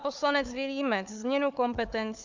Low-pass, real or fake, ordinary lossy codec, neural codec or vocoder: 7.2 kHz; fake; MP3, 64 kbps; codec, 16 kHz, 4 kbps, FunCodec, trained on Chinese and English, 50 frames a second